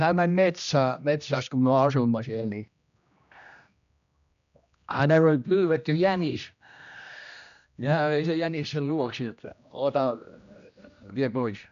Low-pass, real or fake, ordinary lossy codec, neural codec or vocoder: 7.2 kHz; fake; none; codec, 16 kHz, 1 kbps, X-Codec, HuBERT features, trained on general audio